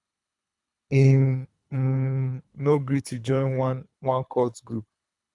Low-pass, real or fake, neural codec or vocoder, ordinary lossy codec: 10.8 kHz; fake; codec, 24 kHz, 3 kbps, HILCodec; none